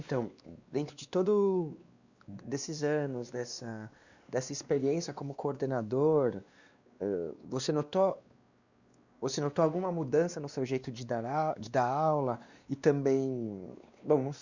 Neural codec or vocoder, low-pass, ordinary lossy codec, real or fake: codec, 16 kHz, 2 kbps, X-Codec, WavLM features, trained on Multilingual LibriSpeech; 7.2 kHz; Opus, 64 kbps; fake